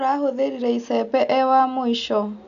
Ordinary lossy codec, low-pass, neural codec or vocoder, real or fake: none; 7.2 kHz; none; real